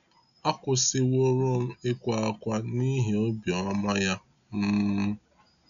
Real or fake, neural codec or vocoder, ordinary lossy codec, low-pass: real; none; none; 7.2 kHz